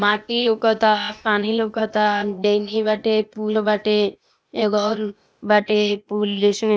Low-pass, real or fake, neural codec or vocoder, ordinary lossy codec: none; fake; codec, 16 kHz, 0.8 kbps, ZipCodec; none